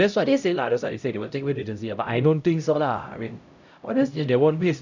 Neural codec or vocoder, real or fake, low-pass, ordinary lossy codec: codec, 16 kHz, 0.5 kbps, X-Codec, HuBERT features, trained on LibriSpeech; fake; 7.2 kHz; none